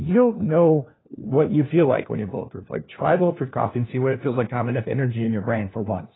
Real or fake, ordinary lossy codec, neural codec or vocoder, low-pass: fake; AAC, 16 kbps; codec, 16 kHz, 1 kbps, FunCodec, trained on LibriTTS, 50 frames a second; 7.2 kHz